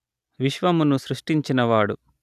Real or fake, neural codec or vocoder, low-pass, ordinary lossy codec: real; none; 14.4 kHz; none